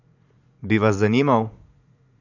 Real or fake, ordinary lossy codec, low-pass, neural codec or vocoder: fake; none; 7.2 kHz; vocoder, 44.1 kHz, 80 mel bands, Vocos